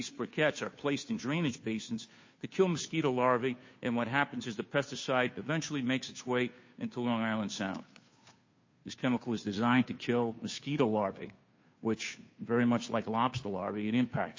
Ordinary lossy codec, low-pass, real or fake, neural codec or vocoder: MP3, 32 kbps; 7.2 kHz; fake; codec, 16 kHz, 2 kbps, FunCodec, trained on Chinese and English, 25 frames a second